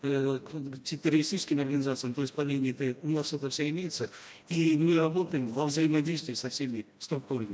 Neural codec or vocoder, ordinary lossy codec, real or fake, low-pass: codec, 16 kHz, 1 kbps, FreqCodec, smaller model; none; fake; none